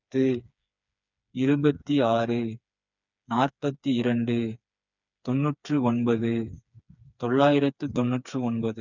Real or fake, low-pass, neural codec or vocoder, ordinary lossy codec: fake; 7.2 kHz; codec, 16 kHz, 4 kbps, FreqCodec, smaller model; none